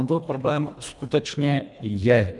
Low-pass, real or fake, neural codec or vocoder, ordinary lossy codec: 10.8 kHz; fake; codec, 24 kHz, 1.5 kbps, HILCodec; MP3, 96 kbps